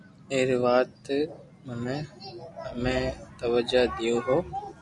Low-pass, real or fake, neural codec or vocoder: 10.8 kHz; real; none